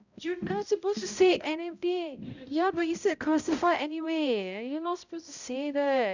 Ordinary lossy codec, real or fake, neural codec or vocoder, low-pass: none; fake; codec, 16 kHz, 0.5 kbps, X-Codec, HuBERT features, trained on balanced general audio; 7.2 kHz